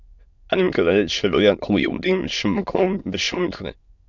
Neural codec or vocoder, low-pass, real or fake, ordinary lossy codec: autoencoder, 22.05 kHz, a latent of 192 numbers a frame, VITS, trained on many speakers; 7.2 kHz; fake; Opus, 64 kbps